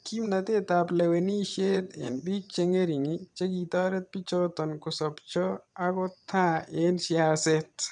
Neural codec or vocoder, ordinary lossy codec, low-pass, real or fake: vocoder, 22.05 kHz, 80 mel bands, WaveNeXt; none; 9.9 kHz; fake